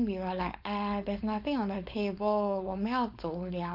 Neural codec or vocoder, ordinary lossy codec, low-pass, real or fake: codec, 16 kHz, 4.8 kbps, FACodec; none; 5.4 kHz; fake